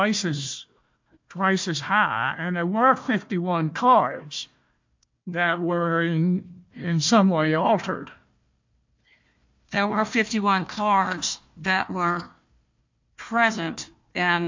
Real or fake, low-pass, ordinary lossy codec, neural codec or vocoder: fake; 7.2 kHz; MP3, 48 kbps; codec, 16 kHz, 1 kbps, FunCodec, trained on Chinese and English, 50 frames a second